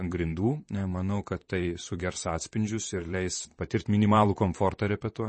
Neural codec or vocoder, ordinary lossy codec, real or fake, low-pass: vocoder, 44.1 kHz, 128 mel bands, Pupu-Vocoder; MP3, 32 kbps; fake; 10.8 kHz